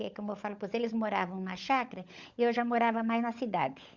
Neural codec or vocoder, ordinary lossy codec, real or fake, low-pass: codec, 16 kHz, 16 kbps, FunCodec, trained on LibriTTS, 50 frames a second; Opus, 64 kbps; fake; 7.2 kHz